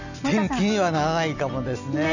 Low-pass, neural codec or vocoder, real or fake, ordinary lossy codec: 7.2 kHz; none; real; none